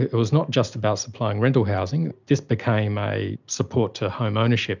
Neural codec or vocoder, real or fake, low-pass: none; real; 7.2 kHz